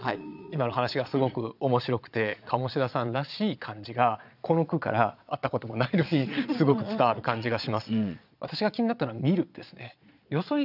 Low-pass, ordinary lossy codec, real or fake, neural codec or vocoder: 5.4 kHz; none; fake; vocoder, 22.05 kHz, 80 mel bands, Vocos